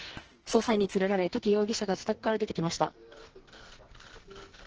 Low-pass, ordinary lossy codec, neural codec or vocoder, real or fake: 7.2 kHz; Opus, 16 kbps; codec, 44.1 kHz, 2.6 kbps, SNAC; fake